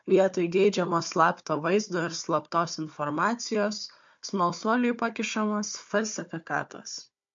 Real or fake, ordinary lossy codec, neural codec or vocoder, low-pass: fake; MP3, 48 kbps; codec, 16 kHz, 4 kbps, FunCodec, trained on Chinese and English, 50 frames a second; 7.2 kHz